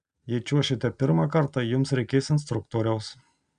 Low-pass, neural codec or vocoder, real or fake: 9.9 kHz; none; real